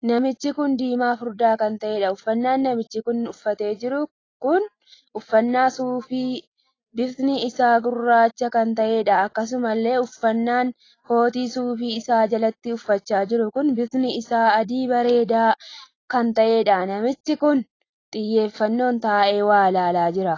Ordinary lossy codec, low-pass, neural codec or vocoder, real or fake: AAC, 32 kbps; 7.2 kHz; none; real